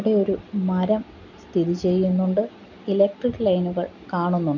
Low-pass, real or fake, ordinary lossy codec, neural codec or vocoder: 7.2 kHz; real; none; none